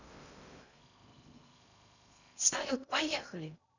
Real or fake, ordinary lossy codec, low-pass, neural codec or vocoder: fake; none; 7.2 kHz; codec, 16 kHz in and 24 kHz out, 0.6 kbps, FocalCodec, streaming, 2048 codes